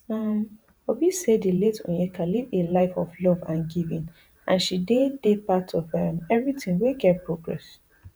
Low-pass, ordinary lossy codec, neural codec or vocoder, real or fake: none; none; vocoder, 48 kHz, 128 mel bands, Vocos; fake